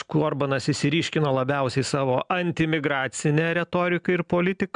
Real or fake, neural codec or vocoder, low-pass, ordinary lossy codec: real; none; 9.9 kHz; MP3, 96 kbps